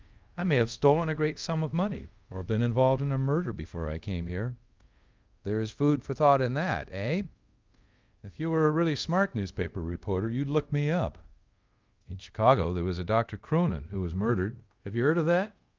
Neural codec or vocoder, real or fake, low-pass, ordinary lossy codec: codec, 24 kHz, 0.5 kbps, DualCodec; fake; 7.2 kHz; Opus, 24 kbps